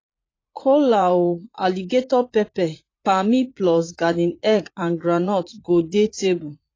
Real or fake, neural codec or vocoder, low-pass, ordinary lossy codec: fake; vocoder, 44.1 kHz, 80 mel bands, Vocos; 7.2 kHz; AAC, 32 kbps